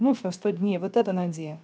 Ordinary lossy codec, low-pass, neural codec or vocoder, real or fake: none; none; codec, 16 kHz, 0.7 kbps, FocalCodec; fake